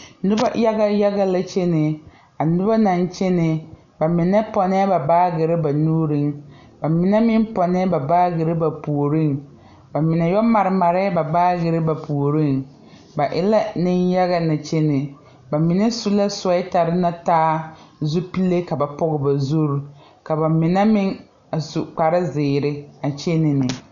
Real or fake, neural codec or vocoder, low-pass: real; none; 7.2 kHz